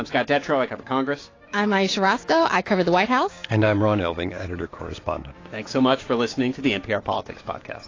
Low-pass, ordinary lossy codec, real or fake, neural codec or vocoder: 7.2 kHz; AAC, 32 kbps; fake; codec, 16 kHz, 6 kbps, DAC